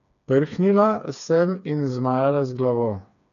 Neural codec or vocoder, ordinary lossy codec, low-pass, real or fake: codec, 16 kHz, 4 kbps, FreqCodec, smaller model; none; 7.2 kHz; fake